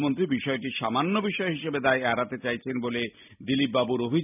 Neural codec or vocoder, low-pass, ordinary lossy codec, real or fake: none; 3.6 kHz; none; real